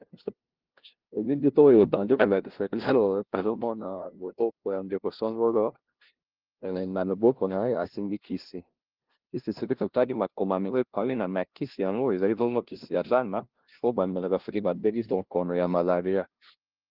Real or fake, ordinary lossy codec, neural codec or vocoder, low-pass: fake; Opus, 16 kbps; codec, 16 kHz, 0.5 kbps, FunCodec, trained on LibriTTS, 25 frames a second; 5.4 kHz